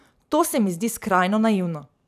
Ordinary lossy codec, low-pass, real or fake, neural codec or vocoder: none; 14.4 kHz; fake; vocoder, 44.1 kHz, 128 mel bands every 512 samples, BigVGAN v2